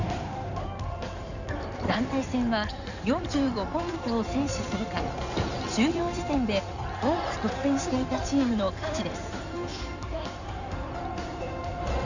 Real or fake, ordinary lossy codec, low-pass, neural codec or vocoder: fake; none; 7.2 kHz; codec, 16 kHz in and 24 kHz out, 2.2 kbps, FireRedTTS-2 codec